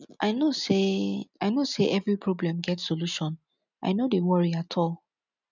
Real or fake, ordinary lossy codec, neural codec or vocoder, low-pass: real; none; none; 7.2 kHz